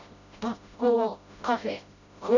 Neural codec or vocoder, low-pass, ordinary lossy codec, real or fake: codec, 16 kHz, 0.5 kbps, FreqCodec, smaller model; 7.2 kHz; none; fake